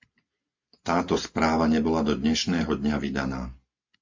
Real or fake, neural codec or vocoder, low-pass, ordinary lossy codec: real; none; 7.2 kHz; MP3, 48 kbps